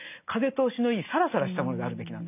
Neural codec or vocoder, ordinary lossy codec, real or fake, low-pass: none; none; real; 3.6 kHz